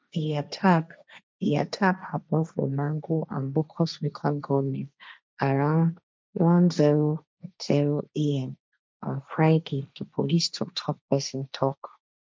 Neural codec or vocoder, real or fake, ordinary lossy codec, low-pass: codec, 16 kHz, 1.1 kbps, Voila-Tokenizer; fake; none; none